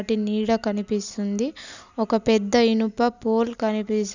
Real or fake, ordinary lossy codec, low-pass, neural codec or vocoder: real; none; 7.2 kHz; none